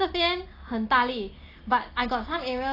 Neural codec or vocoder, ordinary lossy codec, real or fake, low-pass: none; AAC, 24 kbps; real; 5.4 kHz